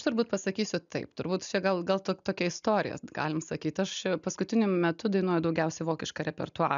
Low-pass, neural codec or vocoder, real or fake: 7.2 kHz; none; real